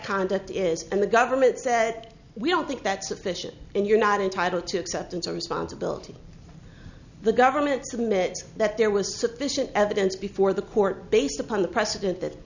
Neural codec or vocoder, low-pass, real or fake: none; 7.2 kHz; real